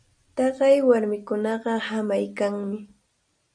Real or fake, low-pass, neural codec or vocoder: real; 9.9 kHz; none